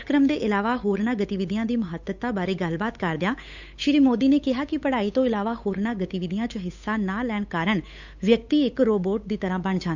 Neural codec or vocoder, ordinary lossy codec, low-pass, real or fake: codec, 16 kHz, 8 kbps, FunCodec, trained on Chinese and English, 25 frames a second; none; 7.2 kHz; fake